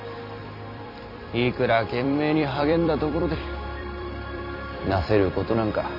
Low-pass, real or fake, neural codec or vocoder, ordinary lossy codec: 5.4 kHz; fake; vocoder, 44.1 kHz, 128 mel bands every 256 samples, BigVGAN v2; none